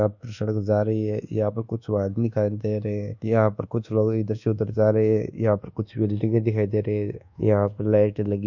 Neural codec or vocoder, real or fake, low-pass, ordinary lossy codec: codec, 24 kHz, 1.2 kbps, DualCodec; fake; 7.2 kHz; none